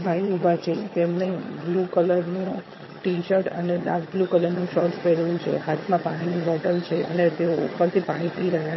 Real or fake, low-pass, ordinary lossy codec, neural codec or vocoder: fake; 7.2 kHz; MP3, 24 kbps; vocoder, 22.05 kHz, 80 mel bands, HiFi-GAN